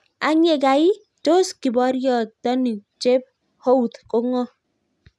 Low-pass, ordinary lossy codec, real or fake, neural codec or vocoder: none; none; real; none